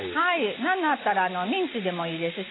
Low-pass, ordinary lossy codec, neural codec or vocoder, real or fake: 7.2 kHz; AAC, 16 kbps; none; real